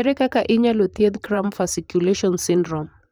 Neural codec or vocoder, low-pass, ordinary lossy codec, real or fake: vocoder, 44.1 kHz, 128 mel bands, Pupu-Vocoder; none; none; fake